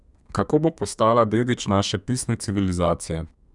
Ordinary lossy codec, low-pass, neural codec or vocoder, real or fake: none; 10.8 kHz; codec, 32 kHz, 1.9 kbps, SNAC; fake